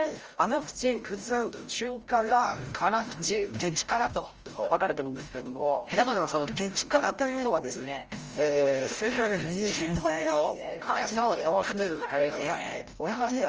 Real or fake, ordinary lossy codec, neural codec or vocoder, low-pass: fake; Opus, 24 kbps; codec, 16 kHz, 0.5 kbps, FreqCodec, larger model; 7.2 kHz